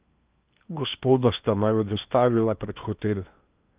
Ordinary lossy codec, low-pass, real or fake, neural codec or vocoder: Opus, 24 kbps; 3.6 kHz; fake; codec, 16 kHz in and 24 kHz out, 0.8 kbps, FocalCodec, streaming, 65536 codes